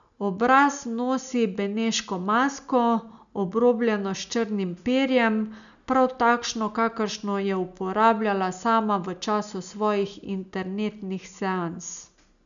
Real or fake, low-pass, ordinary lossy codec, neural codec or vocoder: real; 7.2 kHz; none; none